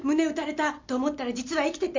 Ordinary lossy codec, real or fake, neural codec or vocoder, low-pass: MP3, 64 kbps; real; none; 7.2 kHz